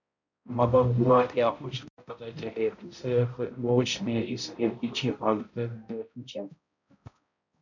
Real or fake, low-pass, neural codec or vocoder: fake; 7.2 kHz; codec, 16 kHz, 0.5 kbps, X-Codec, HuBERT features, trained on balanced general audio